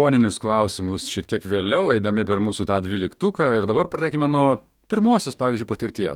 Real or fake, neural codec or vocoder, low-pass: fake; codec, 44.1 kHz, 2.6 kbps, DAC; 19.8 kHz